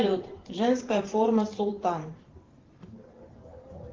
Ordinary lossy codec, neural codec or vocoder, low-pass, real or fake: Opus, 16 kbps; vocoder, 44.1 kHz, 128 mel bands every 512 samples, BigVGAN v2; 7.2 kHz; fake